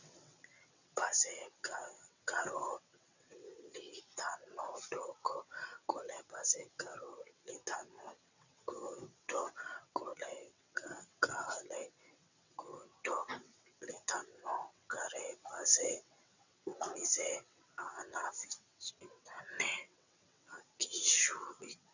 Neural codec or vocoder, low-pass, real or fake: vocoder, 22.05 kHz, 80 mel bands, WaveNeXt; 7.2 kHz; fake